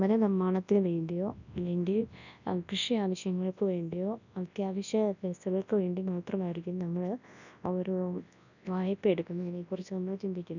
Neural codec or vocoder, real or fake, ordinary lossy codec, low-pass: codec, 24 kHz, 0.9 kbps, WavTokenizer, large speech release; fake; none; 7.2 kHz